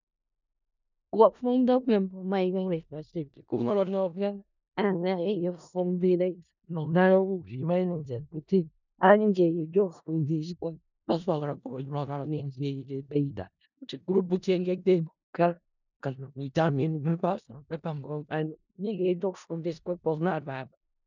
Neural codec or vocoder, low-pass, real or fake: codec, 16 kHz in and 24 kHz out, 0.4 kbps, LongCat-Audio-Codec, four codebook decoder; 7.2 kHz; fake